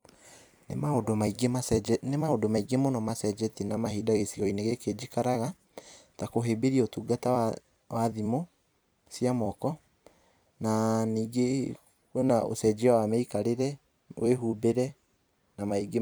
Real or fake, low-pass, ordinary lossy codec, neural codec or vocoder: fake; none; none; vocoder, 44.1 kHz, 128 mel bands every 256 samples, BigVGAN v2